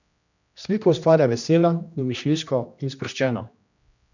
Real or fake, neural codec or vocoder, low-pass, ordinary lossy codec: fake; codec, 16 kHz, 1 kbps, X-Codec, HuBERT features, trained on general audio; 7.2 kHz; none